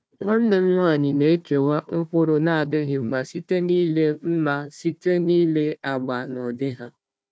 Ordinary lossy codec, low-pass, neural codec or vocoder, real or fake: none; none; codec, 16 kHz, 1 kbps, FunCodec, trained on Chinese and English, 50 frames a second; fake